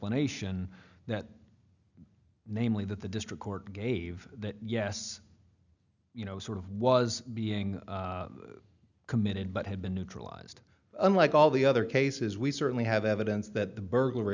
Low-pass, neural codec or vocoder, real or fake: 7.2 kHz; none; real